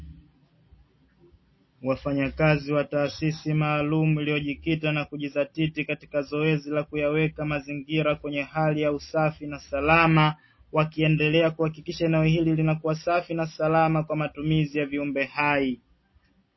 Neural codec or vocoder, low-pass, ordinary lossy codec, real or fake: none; 7.2 kHz; MP3, 24 kbps; real